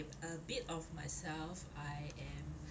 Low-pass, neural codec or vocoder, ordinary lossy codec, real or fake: none; none; none; real